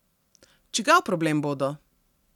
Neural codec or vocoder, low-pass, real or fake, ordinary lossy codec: none; 19.8 kHz; real; none